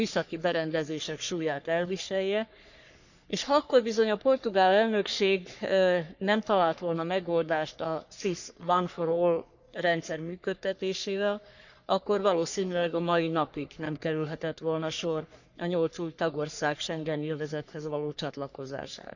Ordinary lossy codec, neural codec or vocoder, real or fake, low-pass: none; codec, 44.1 kHz, 3.4 kbps, Pupu-Codec; fake; 7.2 kHz